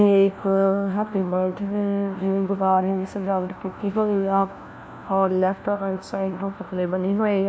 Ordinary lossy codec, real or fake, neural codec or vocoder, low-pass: none; fake; codec, 16 kHz, 1 kbps, FunCodec, trained on LibriTTS, 50 frames a second; none